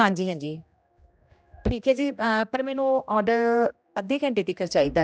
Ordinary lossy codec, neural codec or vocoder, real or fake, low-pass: none; codec, 16 kHz, 1 kbps, X-Codec, HuBERT features, trained on general audio; fake; none